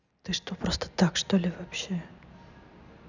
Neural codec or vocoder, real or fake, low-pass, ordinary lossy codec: none; real; 7.2 kHz; none